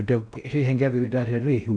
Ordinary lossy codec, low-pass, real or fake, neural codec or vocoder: none; 9.9 kHz; fake; codec, 16 kHz in and 24 kHz out, 0.6 kbps, FocalCodec, streaming, 4096 codes